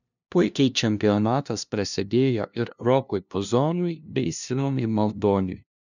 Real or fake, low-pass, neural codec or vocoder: fake; 7.2 kHz; codec, 16 kHz, 0.5 kbps, FunCodec, trained on LibriTTS, 25 frames a second